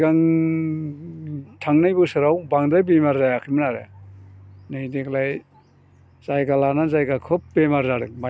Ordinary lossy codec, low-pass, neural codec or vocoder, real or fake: none; none; none; real